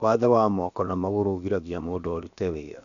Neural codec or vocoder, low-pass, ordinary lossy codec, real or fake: codec, 16 kHz, about 1 kbps, DyCAST, with the encoder's durations; 7.2 kHz; none; fake